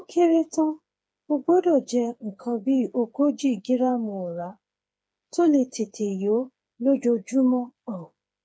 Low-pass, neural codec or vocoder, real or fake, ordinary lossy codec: none; codec, 16 kHz, 4 kbps, FreqCodec, smaller model; fake; none